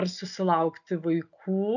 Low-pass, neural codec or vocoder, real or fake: 7.2 kHz; none; real